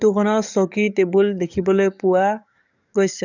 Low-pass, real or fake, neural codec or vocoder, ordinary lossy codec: 7.2 kHz; fake; codec, 44.1 kHz, 7.8 kbps, DAC; none